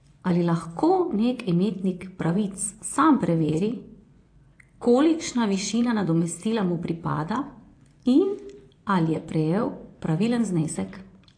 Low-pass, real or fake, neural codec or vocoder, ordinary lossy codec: 9.9 kHz; fake; vocoder, 24 kHz, 100 mel bands, Vocos; AAC, 48 kbps